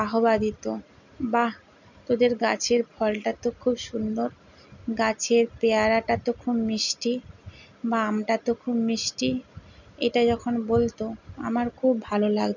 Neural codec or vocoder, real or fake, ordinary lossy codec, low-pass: none; real; none; 7.2 kHz